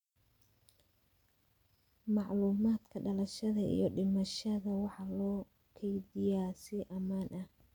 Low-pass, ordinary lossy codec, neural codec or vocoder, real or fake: 19.8 kHz; none; none; real